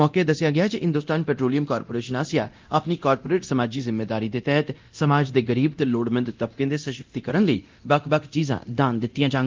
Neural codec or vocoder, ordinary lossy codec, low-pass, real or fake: codec, 24 kHz, 0.9 kbps, DualCodec; Opus, 24 kbps; 7.2 kHz; fake